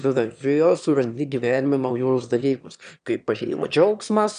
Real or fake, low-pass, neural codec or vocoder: fake; 9.9 kHz; autoencoder, 22.05 kHz, a latent of 192 numbers a frame, VITS, trained on one speaker